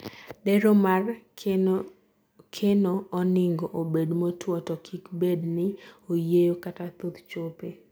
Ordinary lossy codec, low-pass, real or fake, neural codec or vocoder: none; none; real; none